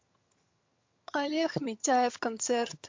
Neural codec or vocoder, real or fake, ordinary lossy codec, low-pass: vocoder, 22.05 kHz, 80 mel bands, HiFi-GAN; fake; MP3, 48 kbps; 7.2 kHz